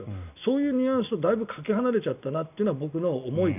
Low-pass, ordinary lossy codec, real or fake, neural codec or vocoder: 3.6 kHz; none; real; none